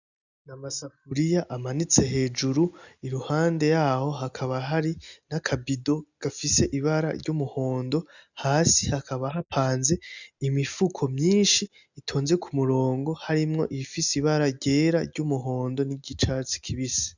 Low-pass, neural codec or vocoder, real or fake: 7.2 kHz; none; real